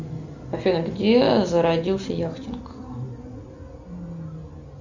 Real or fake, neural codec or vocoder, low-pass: real; none; 7.2 kHz